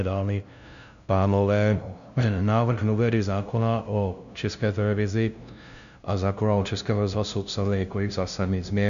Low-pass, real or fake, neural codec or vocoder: 7.2 kHz; fake; codec, 16 kHz, 0.5 kbps, FunCodec, trained on LibriTTS, 25 frames a second